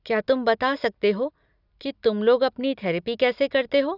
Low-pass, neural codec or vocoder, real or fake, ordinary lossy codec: 5.4 kHz; none; real; none